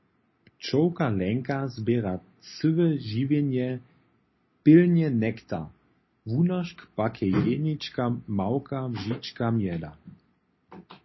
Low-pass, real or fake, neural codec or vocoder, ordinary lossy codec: 7.2 kHz; real; none; MP3, 24 kbps